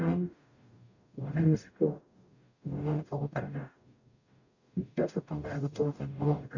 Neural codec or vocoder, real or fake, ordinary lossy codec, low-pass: codec, 44.1 kHz, 0.9 kbps, DAC; fake; none; 7.2 kHz